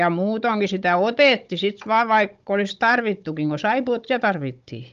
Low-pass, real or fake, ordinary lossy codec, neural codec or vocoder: 7.2 kHz; fake; Opus, 32 kbps; codec, 16 kHz, 16 kbps, FunCodec, trained on Chinese and English, 50 frames a second